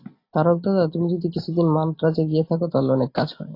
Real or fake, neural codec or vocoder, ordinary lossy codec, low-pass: real; none; AAC, 32 kbps; 5.4 kHz